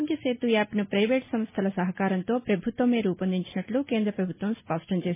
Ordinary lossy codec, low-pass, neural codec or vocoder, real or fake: MP3, 16 kbps; 3.6 kHz; none; real